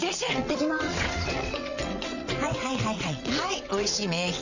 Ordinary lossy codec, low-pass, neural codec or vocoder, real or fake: none; 7.2 kHz; vocoder, 22.05 kHz, 80 mel bands, WaveNeXt; fake